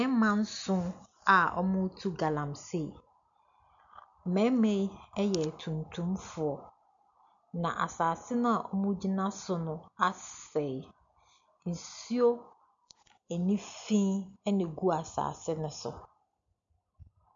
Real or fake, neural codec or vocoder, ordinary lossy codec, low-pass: real; none; MP3, 64 kbps; 7.2 kHz